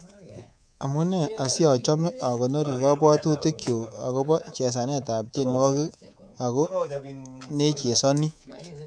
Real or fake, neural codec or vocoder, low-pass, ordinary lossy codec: fake; codec, 24 kHz, 3.1 kbps, DualCodec; 9.9 kHz; none